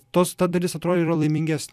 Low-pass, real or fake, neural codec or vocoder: 14.4 kHz; fake; vocoder, 44.1 kHz, 128 mel bands every 256 samples, BigVGAN v2